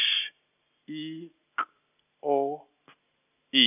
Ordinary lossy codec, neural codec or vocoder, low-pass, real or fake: none; none; 3.6 kHz; real